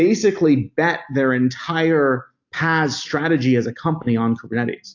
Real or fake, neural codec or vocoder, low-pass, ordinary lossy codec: real; none; 7.2 kHz; AAC, 48 kbps